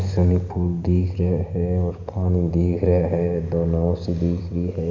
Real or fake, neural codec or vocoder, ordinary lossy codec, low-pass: real; none; none; 7.2 kHz